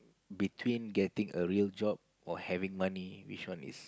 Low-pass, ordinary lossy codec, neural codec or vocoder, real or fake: none; none; none; real